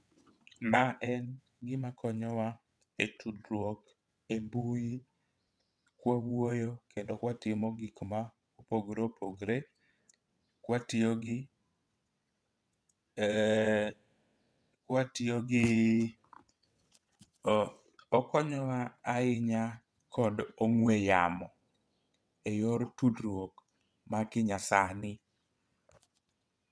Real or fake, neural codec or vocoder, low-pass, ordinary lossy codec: fake; vocoder, 22.05 kHz, 80 mel bands, WaveNeXt; none; none